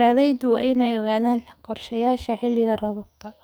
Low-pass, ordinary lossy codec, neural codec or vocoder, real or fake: none; none; codec, 44.1 kHz, 2.6 kbps, SNAC; fake